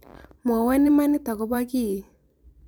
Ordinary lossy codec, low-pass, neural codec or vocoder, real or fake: none; none; vocoder, 44.1 kHz, 128 mel bands every 512 samples, BigVGAN v2; fake